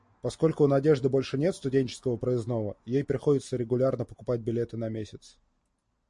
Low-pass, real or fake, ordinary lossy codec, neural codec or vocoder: 10.8 kHz; real; MP3, 48 kbps; none